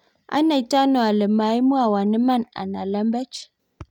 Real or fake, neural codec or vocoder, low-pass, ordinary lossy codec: real; none; 19.8 kHz; none